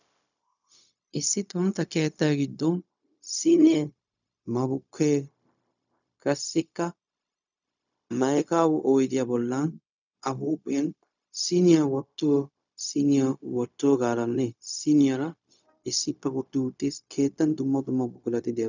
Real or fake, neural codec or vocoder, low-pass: fake; codec, 16 kHz, 0.4 kbps, LongCat-Audio-Codec; 7.2 kHz